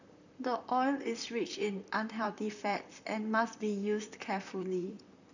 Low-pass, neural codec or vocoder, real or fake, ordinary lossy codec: 7.2 kHz; vocoder, 44.1 kHz, 128 mel bands, Pupu-Vocoder; fake; none